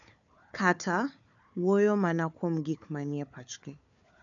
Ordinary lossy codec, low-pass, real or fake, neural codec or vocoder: none; 7.2 kHz; fake; codec, 16 kHz, 4 kbps, FunCodec, trained on Chinese and English, 50 frames a second